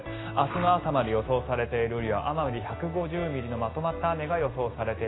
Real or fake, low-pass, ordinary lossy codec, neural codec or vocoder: real; 7.2 kHz; AAC, 16 kbps; none